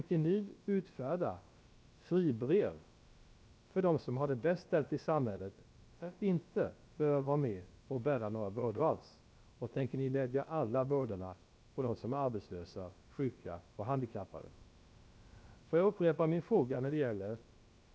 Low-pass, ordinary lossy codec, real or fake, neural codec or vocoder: none; none; fake; codec, 16 kHz, about 1 kbps, DyCAST, with the encoder's durations